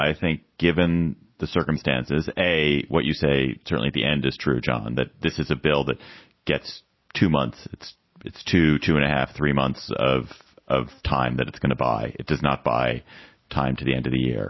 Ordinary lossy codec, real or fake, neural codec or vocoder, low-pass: MP3, 24 kbps; real; none; 7.2 kHz